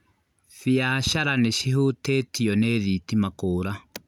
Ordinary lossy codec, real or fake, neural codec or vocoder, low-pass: none; real; none; 19.8 kHz